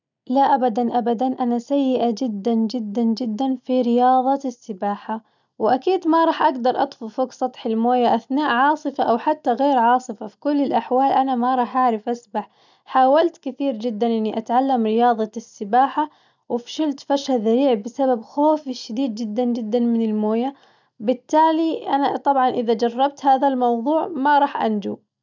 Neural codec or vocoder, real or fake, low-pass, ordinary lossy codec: none; real; 7.2 kHz; none